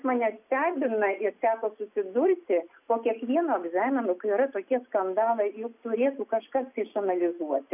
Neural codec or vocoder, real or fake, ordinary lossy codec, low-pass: none; real; AAC, 32 kbps; 3.6 kHz